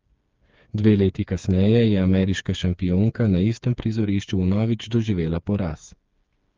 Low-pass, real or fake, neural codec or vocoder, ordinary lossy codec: 7.2 kHz; fake; codec, 16 kHz, 4 kbps, FreqCodec, smaller model; Opus, 32 kbps